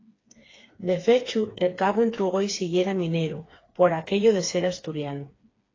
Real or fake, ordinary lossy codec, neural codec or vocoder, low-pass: fake; AAC, 32 kbps; codec, 16 kHz, 4 kbps, FreqCodec, smaller model; 7.2 kHz